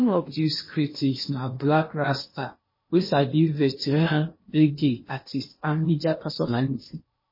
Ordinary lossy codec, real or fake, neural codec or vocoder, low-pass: MP3, 24 kbps; fake; codec, 16 kHz in and 24 kHz out, 0.8 kbps, FocalCodec, streaming, 65536 codes; 5.4 kHz